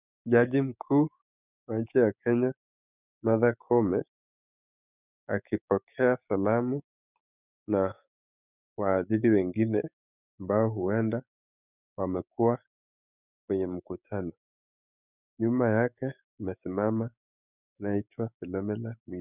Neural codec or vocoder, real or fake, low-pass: codec, 16 kHz, 6 kbps, DAC; fake; 3.6 kHz